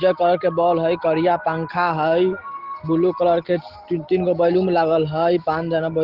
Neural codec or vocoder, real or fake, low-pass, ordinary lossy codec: none; real; 5.4 kHz; Opus, 16 kbps